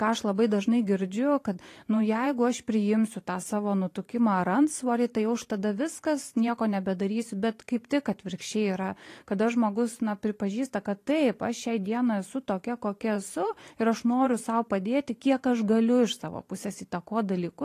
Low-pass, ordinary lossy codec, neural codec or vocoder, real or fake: 14.4 kHz; AAC, 48 kbps; vocoder, 44.1 kHz, 128 mel bands every 512 samples, BigVGAN v2; fake